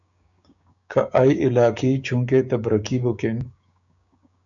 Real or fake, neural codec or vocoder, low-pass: fake; codec, 16 kHz, 6 kbps, DAC; 7.2 kHz